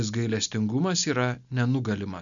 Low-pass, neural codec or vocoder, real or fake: 7.2 kHz; none; real